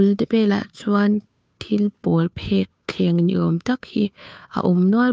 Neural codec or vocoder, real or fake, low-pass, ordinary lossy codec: codec, 16 kHz, 2 kbps, FunCodec, trained on Chinese and English, 25 frames a second; fake; none; none